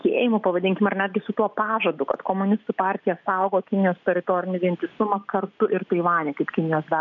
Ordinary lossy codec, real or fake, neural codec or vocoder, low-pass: MP3, 96 kbps; real; none; 7.2 kHz